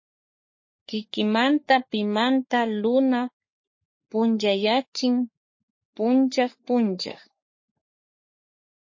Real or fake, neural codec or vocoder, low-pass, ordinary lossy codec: fake; codec, 44.1 kHz, 3.4 kbps, Pupu-Codec; 7.2 kHz; MP3, 32 kbps